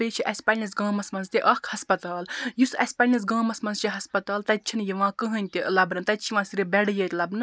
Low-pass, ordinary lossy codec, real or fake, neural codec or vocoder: none; none; real; none